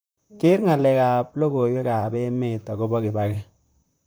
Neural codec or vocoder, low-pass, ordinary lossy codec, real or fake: vocoder, 44.1 kHz, 128 mel bands, Pupu-Vocoder; none; none; fake